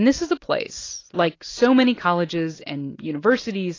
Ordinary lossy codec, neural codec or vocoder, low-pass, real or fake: AAC, 32 kbps; none; 7.2 kHz; real